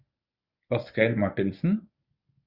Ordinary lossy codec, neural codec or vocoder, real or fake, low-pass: Opus, 64 kbps; codec, 24 kHz, 0.9 kbps, WavTokenizer, medium speech release version 1; fake; 5.4 kHz